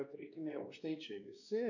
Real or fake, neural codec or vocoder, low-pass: fake; codec, 16 kHz, 2 kbps, X-Codec, WavLM features, trained on Multilingual LibriSpeech; 7.2 kHz